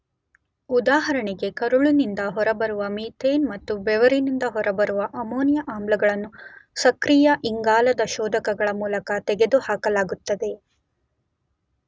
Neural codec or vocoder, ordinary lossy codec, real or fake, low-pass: none; none; real; none